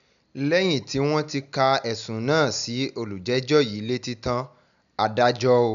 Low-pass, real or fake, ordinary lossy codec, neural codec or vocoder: 7.2 kHz; real; none; none